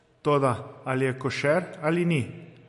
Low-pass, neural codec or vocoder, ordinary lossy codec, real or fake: 14.4 kHz; none; MP3, 48 kbps; real